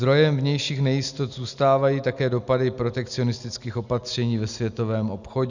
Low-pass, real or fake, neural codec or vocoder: 7.2 kHz; real; none